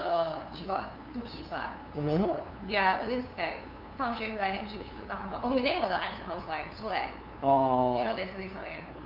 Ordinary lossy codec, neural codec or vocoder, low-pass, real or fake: none; codec, 16 kHz, 2 kbps, FunCodec, trained on LibriTTS, 25 frames a second; 5.4 kHz; fake